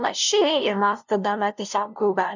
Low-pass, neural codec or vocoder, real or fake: 7.2 kHz; codec, 16 kHz, 0.5 kbps, FunCodec, trained on LibriTTS, 25 frames a second; fake